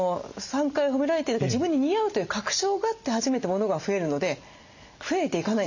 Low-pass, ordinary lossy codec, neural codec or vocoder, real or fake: 7.2 kHz; none; none; real